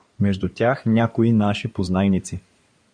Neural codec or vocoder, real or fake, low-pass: codec, 16 kHz in and 24 kHz out, 2.2 kbps, FireRedTTS-2 codec; fake; 9.9 kHz